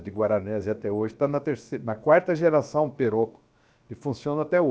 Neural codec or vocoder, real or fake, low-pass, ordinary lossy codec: codec, 16 kHz, about 1 kbps, DyCAST, with the encoder's durations; fake; none; none